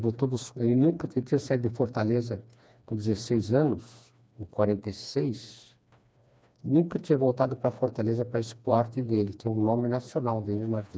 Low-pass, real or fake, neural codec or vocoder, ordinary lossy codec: none; fake; codec, 16 kHz, 2 kbps, FreqCodec, smaller model; none